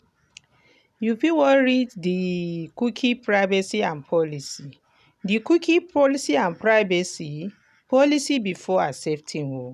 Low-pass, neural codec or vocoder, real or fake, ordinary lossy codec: 14.4 kHz; none; real; none